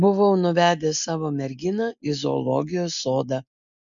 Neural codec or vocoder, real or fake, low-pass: none; real; 7.2 kHz